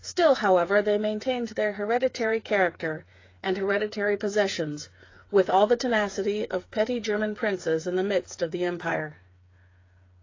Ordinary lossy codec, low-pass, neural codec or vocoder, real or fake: AAC, 32 kbps; 7.2 kHz; codec, 16 kHz, 8 kbps, FreqCodec, smaller model; fake